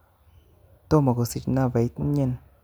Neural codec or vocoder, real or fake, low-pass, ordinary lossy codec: none; real; none; none